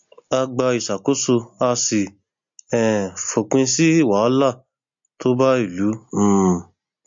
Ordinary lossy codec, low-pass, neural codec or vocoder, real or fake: MP3, 48 kbps; 7.2 kHz; none; real